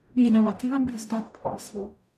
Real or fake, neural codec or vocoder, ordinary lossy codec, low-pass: fake; codec, 44.1 kHz, 0.9 kbps, DAC; none; 14.4 kHz